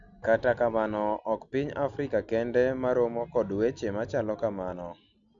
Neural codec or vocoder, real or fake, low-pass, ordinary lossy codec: none; real; 7.2 kHz; none